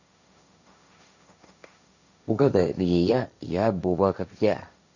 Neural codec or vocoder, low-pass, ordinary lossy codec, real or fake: codec, 16 kHz, 1.1 kbps, Voila-Tokenizer; 7.2 kHz; none; fake